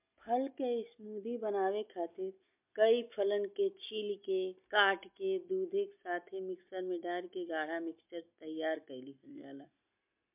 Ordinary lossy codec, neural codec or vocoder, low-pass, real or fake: MP3, 32 kbps; none; 3.6 kHz; real